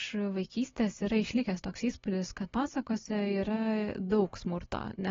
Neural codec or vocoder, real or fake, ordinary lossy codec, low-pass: none; real; AAC, 24 kbps; 7.2 kHz